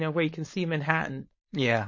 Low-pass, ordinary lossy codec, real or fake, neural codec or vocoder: 7.2 kHz; MP3, 32 kbps; fake; codec, 16 kHz, 4.8 kbps, FACodec